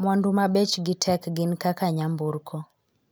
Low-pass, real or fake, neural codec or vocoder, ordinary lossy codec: none; real; none; none